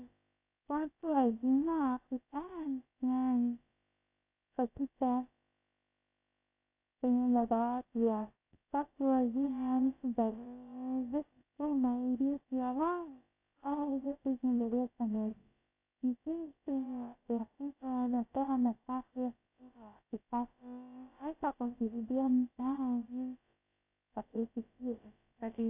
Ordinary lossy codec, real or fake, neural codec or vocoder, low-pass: AAC, 32 kbps; fake; codec, 16 kHz, about 1 kbps, DyCAST, with the encoder's durations; 3.6 kHz